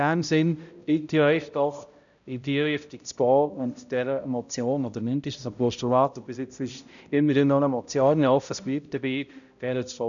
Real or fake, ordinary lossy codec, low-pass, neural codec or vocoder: fake; none; 7.2 kHz; codec, 16 kHz, 0.5 kbps, X-Codec, HuBERT features, trained on balanced general audio